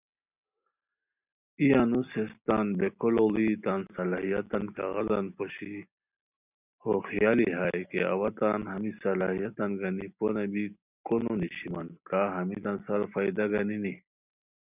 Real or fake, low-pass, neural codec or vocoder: real; 3.6 kHz; none